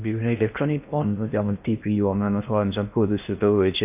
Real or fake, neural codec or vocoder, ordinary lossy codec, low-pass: fake; codec, 16 kHz in and 24 kHz out, 0.6 kbps, FocalCodec, streaming, 2048 codes; AAC, 32 kbps; 3.6 kHz